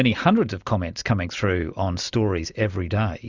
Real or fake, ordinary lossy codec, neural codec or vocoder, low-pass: real; Opus, 64 kbps; none; 7.2 kHz